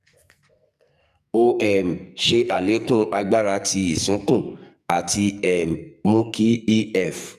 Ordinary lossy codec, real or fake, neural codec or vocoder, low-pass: none; fake; codec, 44.1 kHz, 2.6 kbps, SNAC; 14.4 kHz